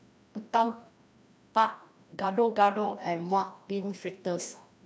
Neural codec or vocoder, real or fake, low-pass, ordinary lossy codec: codec, 16 kHz, 1 kbps, FreqCodec, larger model; fake; none; none